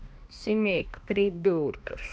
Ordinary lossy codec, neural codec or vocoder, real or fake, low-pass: none; codec, 16 kHz, 1 kbps, X-Codec, HuBERT features, trained on balanced general audio; fake; none